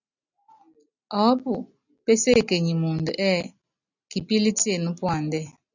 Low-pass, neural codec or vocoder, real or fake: 7.2 kHz; none; real